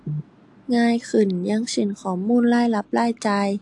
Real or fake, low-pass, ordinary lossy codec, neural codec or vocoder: real; 10.8 kHz; AAC, 64 kbps; none